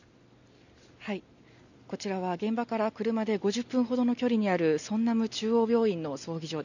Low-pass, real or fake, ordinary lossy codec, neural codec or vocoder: 7.2 kHz; real; none; none